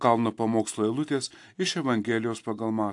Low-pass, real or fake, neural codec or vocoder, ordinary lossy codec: 10.8 kHz; real; none; AAC, 64 kbps